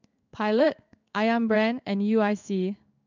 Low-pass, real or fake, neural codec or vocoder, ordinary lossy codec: 7.2 kHz; fake; codec, 16 kHz in and 24 kHz out, 1 kbps, XY-Tokenizer; none